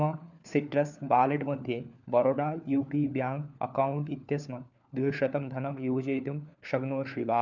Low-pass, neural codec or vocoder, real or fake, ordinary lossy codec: 7.2 kHz; codec, 16 kHz, 4 kbps, FunCodec, trained on LibriTTS, 50 frames a second; fake; none